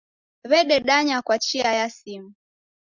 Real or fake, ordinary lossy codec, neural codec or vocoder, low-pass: real; Opus, 64 kbps; none; 7.2 kHz